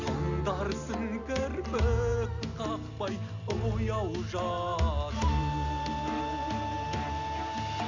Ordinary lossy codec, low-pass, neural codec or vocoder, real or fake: none; 7.2 kHz; none; real